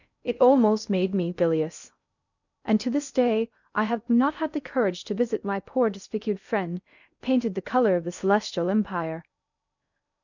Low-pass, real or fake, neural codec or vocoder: 7.2 kHz; fake; codec, 16 kHz in and 24 kHz out, 0.6 kbps, FocalCodec, streaming, 2048 codes